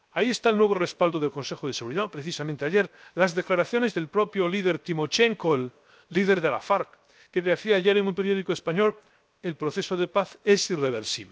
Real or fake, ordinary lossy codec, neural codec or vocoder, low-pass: fake; none; codec, 16 kHz, 0.7 kbps, FocalCodec; none